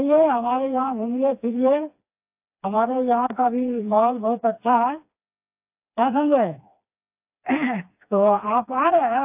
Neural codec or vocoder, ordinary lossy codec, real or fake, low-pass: codec, 16 kHz, 2 kbps, FreqCodec, smaller model; AAC, 32 kbps; fake; 3.6 kHz